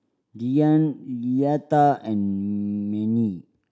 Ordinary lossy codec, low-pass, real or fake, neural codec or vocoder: none; none; real; none